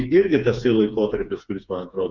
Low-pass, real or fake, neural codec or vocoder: 7.2 kHz; fake; codec, 16 kHz, 4 kbps, FreqCodec, smaller model